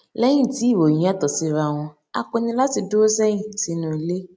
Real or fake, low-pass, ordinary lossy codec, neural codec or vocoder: real; none; none; none